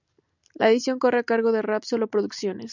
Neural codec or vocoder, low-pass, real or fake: none; 7.2 kHz; real